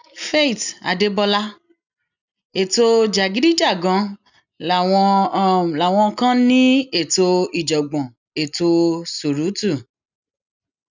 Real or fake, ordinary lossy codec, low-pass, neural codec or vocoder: real; none; 7.2 kHz; none